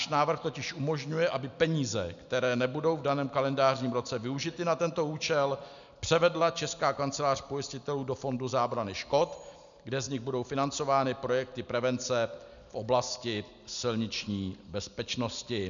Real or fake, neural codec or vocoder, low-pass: real; none; 7.2 kHz